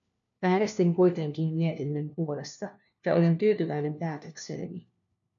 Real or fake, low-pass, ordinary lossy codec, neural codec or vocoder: fake; 7.2 kHz; MP3, 96 kbps; codec, 16 kHz, 1 kbps, FunCodec, trained on LibriTTS, 50 frames a second